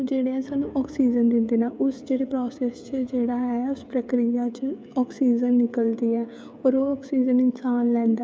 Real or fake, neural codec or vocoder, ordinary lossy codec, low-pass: fake; codec, 16 kHz, 16 kbps, FreqCodec, smaller model; none; none